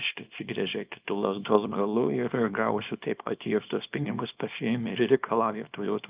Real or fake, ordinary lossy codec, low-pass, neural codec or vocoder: fake; Opus, 24 kbps; 3.6 kHz; codec, 24 kHz, 0.9 kbps, WavTokenizer, small release